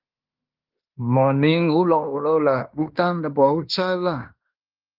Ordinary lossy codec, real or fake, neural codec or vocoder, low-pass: Opus, 32 kbps; fake; codec, 16 kHz in and 24 kHz out, 0.9 kbps, LongCat-Audio-Codec, fine tuned four codebook decoder; 5.4 kHz